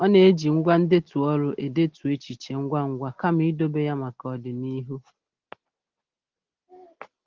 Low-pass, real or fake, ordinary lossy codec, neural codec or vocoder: 7.2 kHz; real; Opus, 16 kbps; none